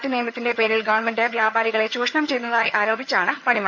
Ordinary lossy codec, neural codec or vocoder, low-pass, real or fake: none; vocoder, 22.05 kHz, 80 mel bands, HiFi-GAN; 7.2 kHz; fake